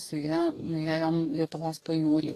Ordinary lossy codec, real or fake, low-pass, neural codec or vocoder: AAC, 48 kbps; fake; 14.4 kHz; codec, 44.1 kHz, 2.6 kbps, DAC